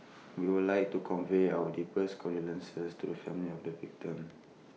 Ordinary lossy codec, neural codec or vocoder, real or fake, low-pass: none; none; real; none